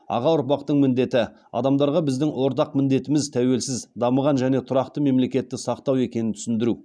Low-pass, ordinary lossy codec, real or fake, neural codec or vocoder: none; none; real; none